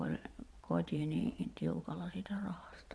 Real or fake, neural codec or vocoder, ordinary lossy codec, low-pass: fake; vocoder, 22.05 kHz, 80 mel bands, Vocos; none; none